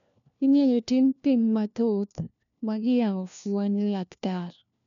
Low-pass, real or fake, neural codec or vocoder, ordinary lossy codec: 7.2 kHz; fake; codec, 16 kHz, 1 kbps, FunCodec, trained on LibriTTS, 50 frames a second; none